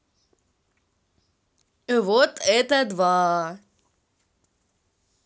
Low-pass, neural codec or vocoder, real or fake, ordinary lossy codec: none; none; real; none